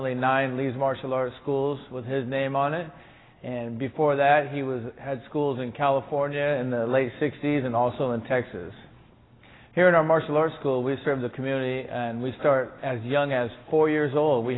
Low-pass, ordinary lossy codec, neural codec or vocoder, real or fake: 7.2 kHz; AAC, 16 kbps; none; real